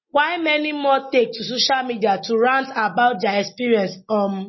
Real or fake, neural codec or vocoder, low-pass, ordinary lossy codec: real; none; 7.2 kHz; MP3, 24 kbps